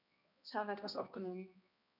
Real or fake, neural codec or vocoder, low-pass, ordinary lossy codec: fake; codec, 16 kHz, 1 kbps, X-Codec, HuBERT features, trained on balanced general audio; 5.4 kHz; none